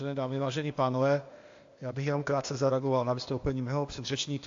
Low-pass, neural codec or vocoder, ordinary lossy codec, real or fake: 7.2 kHz; codec, 16 kHz, 0.8 kbps, ZipCodec; AAC, 48 kbps; fake